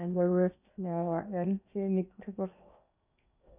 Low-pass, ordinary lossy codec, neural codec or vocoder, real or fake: 3.6 kHz; none; codec, 16 kHz in and 24 kHz out, 0.8 kbps, FocalCodec, streaming, 65536 codes; fake